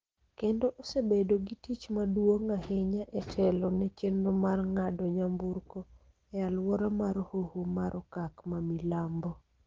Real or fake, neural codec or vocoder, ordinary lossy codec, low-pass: real; none; Opus, 16 kbps; 7.2 kHz